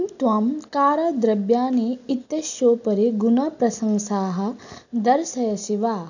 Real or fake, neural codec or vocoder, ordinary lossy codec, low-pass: real; none; none; 7.2 kHz